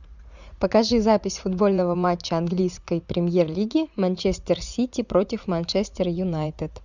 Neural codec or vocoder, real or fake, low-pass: vocoder, 44.1 kHz, 80 mel bands, Vocos; fake; 7.2 kHz